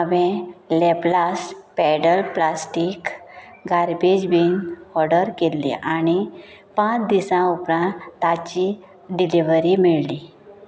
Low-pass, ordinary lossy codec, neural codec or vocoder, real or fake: none; none; none; real